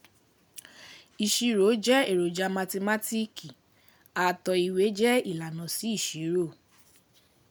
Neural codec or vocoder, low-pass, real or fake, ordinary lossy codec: none; none; real; none